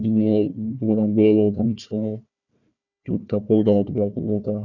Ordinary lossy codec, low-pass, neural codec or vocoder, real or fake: none; 7.2 kHz; codec, 16 kHz, 1 kbps, FunCodec, trained on Chinese and English, 50 frames a second; fake